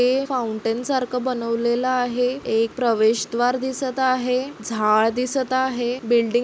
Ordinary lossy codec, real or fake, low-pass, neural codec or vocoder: none; real; none; none